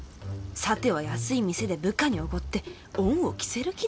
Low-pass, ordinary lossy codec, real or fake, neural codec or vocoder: none; none; real; none